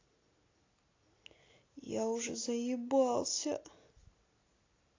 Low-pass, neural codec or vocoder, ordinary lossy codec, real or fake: 7.2 kHz; none; AAC, 32 kbps; real